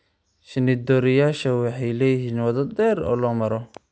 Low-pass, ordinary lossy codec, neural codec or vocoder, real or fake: none; none; none; real